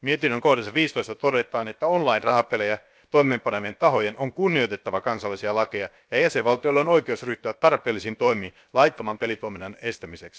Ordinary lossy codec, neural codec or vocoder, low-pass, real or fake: none; codec, 16 kHz, about 1 kbps, DyCAST, with the encoder's durations; none; fake